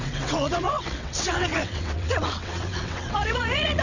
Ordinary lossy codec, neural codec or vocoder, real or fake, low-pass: none; vocoder, 22.05 kHz, 80 mel bands, WaveNeXt; fake; 7.2 kHz